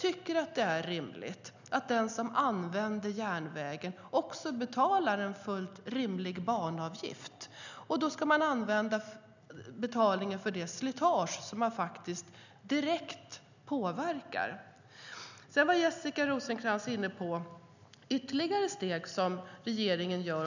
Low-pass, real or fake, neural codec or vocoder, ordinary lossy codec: 7.2 kHz; real; none; none